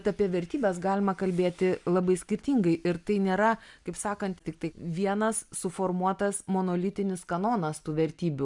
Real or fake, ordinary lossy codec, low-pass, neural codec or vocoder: real; MP3, 96 kbps; 10.8 kHz; none